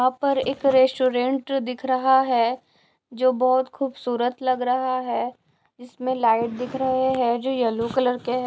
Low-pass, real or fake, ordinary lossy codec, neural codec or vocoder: none; real; none; none